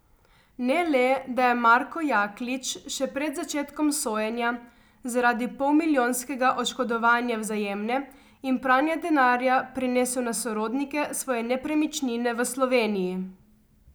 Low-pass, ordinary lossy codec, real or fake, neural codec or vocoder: none; none; real; none